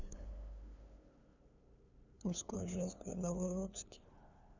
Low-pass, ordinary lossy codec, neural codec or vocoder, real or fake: 7.2 kHz; none; codec, 16 kHz, 2 kbps, FunCodec, trained on LibriTTS, 25 frames a second; fake